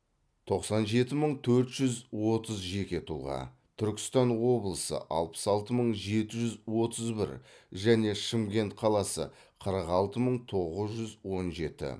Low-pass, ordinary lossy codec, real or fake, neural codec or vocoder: none; none; real; none